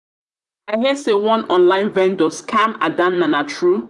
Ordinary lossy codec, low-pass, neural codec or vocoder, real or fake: none; 10.8 kHz; vocoder, 44.1 kHz, 128 mel bands, Pupu-Vocoder; fake